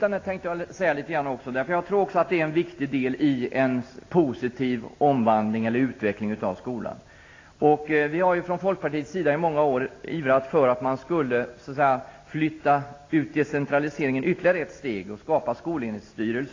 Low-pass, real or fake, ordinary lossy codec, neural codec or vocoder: 7.2 kHz; real; AAC, 32 kbps; none